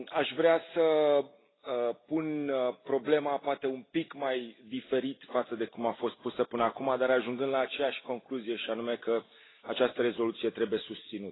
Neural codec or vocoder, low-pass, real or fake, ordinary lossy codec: none; 7.2 kHz; real; AAC, 16 kbps